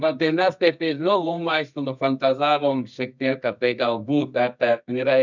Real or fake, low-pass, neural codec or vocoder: fake; 7.2 kHz; codec, 24 kHz, 0.9 kbps, WavTokenizer, medium music audio release